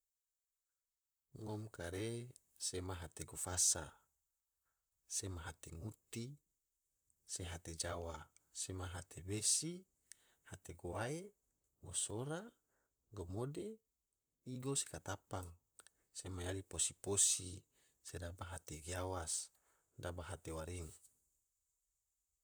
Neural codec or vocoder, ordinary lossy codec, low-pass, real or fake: vocoder, 44.1 kHz, 128 mel bands, Pupu-Vocoder; none; none; fake